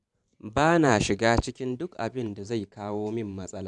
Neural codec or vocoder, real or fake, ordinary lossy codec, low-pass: vocoder, 44.1 kHz, 128 mel bands every 512 samples, BigVGAN v2; fake; none; 10.8 kHz